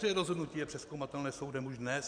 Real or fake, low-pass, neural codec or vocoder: fake; 9.9 kHz; vocoder, 44.1 kHz, 128 mel bands every 512 samples, BigVGAN v2